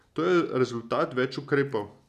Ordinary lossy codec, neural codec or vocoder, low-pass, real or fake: none; none; 14.4 kHz; real